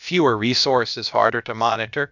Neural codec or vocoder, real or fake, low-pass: codec, 16 kHz, about 1 kbps, DyCAST, with the encoder's durations; fake; 7.2 kHz